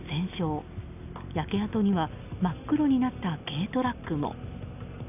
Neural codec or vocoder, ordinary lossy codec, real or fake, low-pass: none; none; real; 3.6 kHz